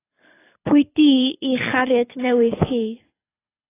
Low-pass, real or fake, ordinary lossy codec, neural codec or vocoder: 3.6 kHz; fake; AAC, 16 kbps; codec, 24 kHz, 6 kbps, HILCodec